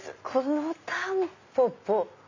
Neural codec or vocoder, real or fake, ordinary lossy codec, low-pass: none; real; AAC, 48 kbps; 7.2 kHz